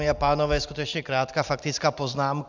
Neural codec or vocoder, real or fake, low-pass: none; real; 7.2 kHz